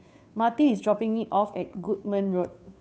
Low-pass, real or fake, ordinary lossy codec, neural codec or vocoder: none; fake; none; codec, 16 kHz, 2 kbps, FunCodec, trained on Chinese and English, 25 frames a second